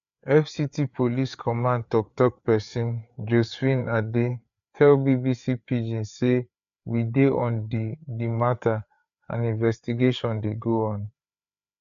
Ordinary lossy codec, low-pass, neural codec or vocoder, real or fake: AAC, 96 kbps; 7.2 kHz; codec, 16 kHz, 4 kbps, FreqCodec, larger model; fake